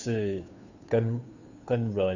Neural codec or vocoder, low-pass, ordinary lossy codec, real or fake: codec, 16 kHz, 2 kbps, FunCodec, trained on Chinese and English, 25 frames a second; 7.2 kHz; none; fake